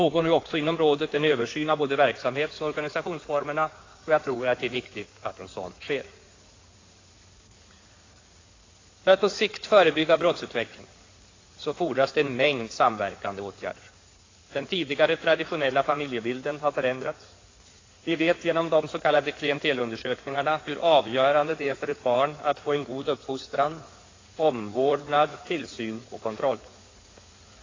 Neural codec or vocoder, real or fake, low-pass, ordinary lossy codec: codec, 16 kHz in and 24 kHz out, 2.2 kbps, FireRedTTS-2 codec; fake; 7.2 kHz; AAC, 32 kbps